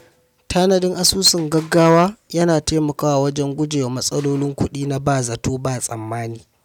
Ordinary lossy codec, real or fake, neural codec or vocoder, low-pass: none; real; none; 19.8 kHz